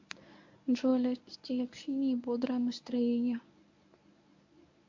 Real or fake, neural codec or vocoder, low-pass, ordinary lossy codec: fake; codec, 24 kHz, 0.9 kbps, WavTokenizer, medium speech release version 1; 7.2 kHz; MP3, 48 kbps